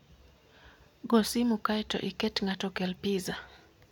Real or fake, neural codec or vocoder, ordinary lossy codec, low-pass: real; none; none; 19.8 kHz